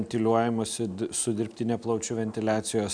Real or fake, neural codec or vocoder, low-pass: real; none; 9.9 kHz